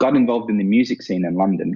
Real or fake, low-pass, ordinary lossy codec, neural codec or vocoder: real; 7.2 kHz; Opus, 64 kbps; none